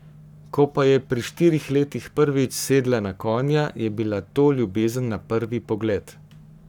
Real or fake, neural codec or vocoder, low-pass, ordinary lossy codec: fake; codec, 44.1 kHz, 7.8 kbps, Pupu-Codec; 19.8 kHz; none